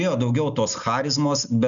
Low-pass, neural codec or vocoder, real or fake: 7.2 kHz; none; real